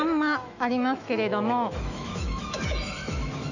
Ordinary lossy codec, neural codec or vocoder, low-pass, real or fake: none; autoencoder, 48 kHz, 128 numbers a frame, DAC-VAE, trained on Japanese speech; 7.2 kHz; fake